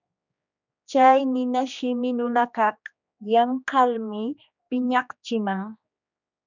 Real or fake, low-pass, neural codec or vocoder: fake; 7.2 kHz; codec, 16 kHz, 2 kbps, X-Codec, HuBERT features, trained on general audio